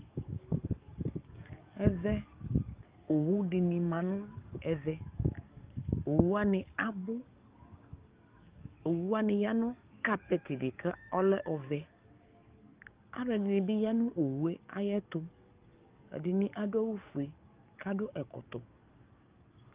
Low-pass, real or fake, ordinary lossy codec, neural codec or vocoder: 3.6 kHz; fake; Opus, 24 kbps; codec, 44.1 kHz, 7.8 kbps, DAC